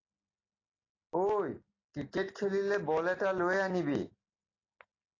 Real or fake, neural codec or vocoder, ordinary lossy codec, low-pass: real; none; AAC, 32 kbps; 7.2 kHz